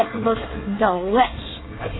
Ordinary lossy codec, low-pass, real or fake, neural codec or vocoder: AAC, 16 kbps; 7.2 kHz; fake; codec, 24 kHz, 1 kbps, SNAC